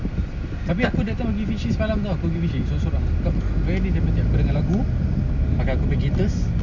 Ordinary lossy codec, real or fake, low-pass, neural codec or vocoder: none; real; 7.2 kHz; none